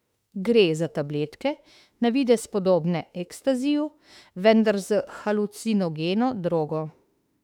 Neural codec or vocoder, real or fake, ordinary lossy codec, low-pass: autoencoder, 48 kHz, 32 numbers a frame, DAC-VAE, trained on Japanese speech; fake; none; 19.8 kHz